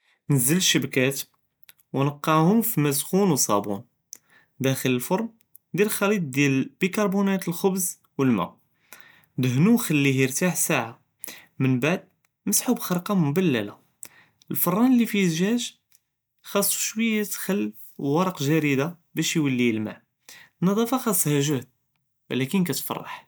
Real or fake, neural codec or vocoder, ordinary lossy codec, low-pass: real; none; none; none